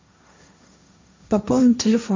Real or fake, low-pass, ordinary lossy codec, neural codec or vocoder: fake; 7.2 kHz; MP3, 64 kbps; codec, 16 kHz, 1.1 kbps, Voila-Tokenizer